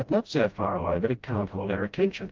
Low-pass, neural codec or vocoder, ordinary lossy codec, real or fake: 7.2 kHz; codec, 16 kHz, 0.5 kbps, FreqCodec, smaller model; Opus, 32 kbps; fake